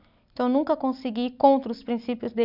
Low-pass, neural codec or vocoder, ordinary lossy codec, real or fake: 5.4 kHz; none; none; real